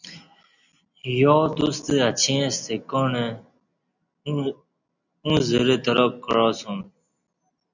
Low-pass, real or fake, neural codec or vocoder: 7.2 kHz; real; none